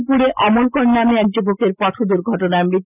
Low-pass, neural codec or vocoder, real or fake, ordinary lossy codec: 3.6 kHz; none; real; none